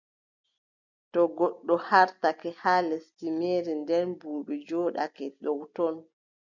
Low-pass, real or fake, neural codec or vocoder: 7.2 kHz; real; none